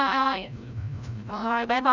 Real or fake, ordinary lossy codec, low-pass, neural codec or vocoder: fake; none; 7.2 kHz; codec, 16 kHz, 0.5 kbps, FreqCodec, larger model